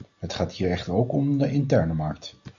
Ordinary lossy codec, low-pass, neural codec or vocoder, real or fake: Opus, 64 kbps; 7.2 kHz; none; real